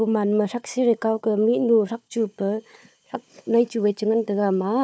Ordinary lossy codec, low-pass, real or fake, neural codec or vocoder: none; none; fake; codec, 16 kHz, 4 kbps, FunCodec, trained on Chinese and English, 50 frames a second